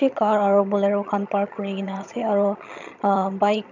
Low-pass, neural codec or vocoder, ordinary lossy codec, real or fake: 7.2 kHz; vocoder, 22.05 kHz, 80 mel bands, HiFi-GAN; none; fake